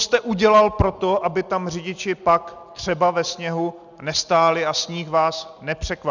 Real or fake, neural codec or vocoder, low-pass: real; none; 7.2 kHz